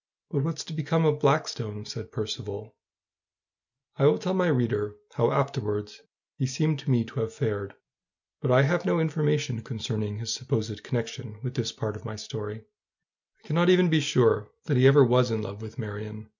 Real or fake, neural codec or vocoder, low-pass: real; none; 7.2 kHz